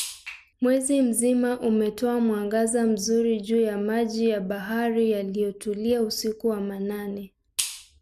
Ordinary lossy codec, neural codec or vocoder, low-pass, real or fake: none; none; 14.4 kHz; real